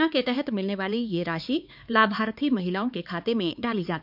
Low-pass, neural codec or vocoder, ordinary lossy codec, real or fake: 5.4 kHz; codec, 16 kHz, 4 kbps, X-Codec, HuBERT features, trained on LibriSpeech; none; fake